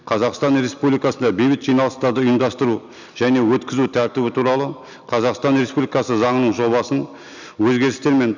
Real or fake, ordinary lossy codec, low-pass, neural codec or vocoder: real; none; 7.2 kHz; none